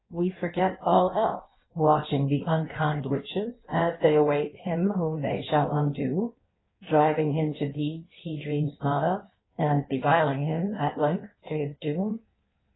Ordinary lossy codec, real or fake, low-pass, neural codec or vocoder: AAC, 16 kbps; fake; 7.2 kHz; codec, 16 kHz in and 24 kHz out, 1.1 kbps, FireRedTTS-2 codec